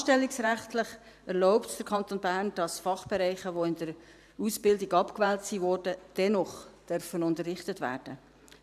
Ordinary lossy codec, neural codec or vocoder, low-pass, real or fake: none; vocoder, 44.1 kHz, 128 mel bands every 512 samples, BigVGAN v2; 14.4 kHz; fake